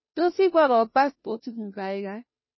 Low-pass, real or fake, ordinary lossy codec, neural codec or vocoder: 7.2 kHz; fake; MP3, 24 kbps; codec, 16 kHz, 0.5 kbps, FunCodec, trained on Chinese and English, 25 frames a second